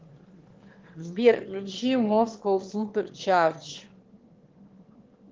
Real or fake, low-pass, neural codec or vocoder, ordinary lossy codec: fake; 7.2 kHz; autoencoder, 22.05 kHz, a latent of 192 numbers a frame, VITS, trained on one speaker; Opus, 16 kbps